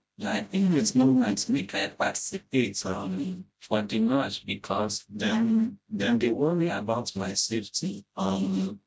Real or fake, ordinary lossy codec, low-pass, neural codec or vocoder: fake; none; none; codec, 16 kHz, 0.5 kbps, FreqCodec, smaller model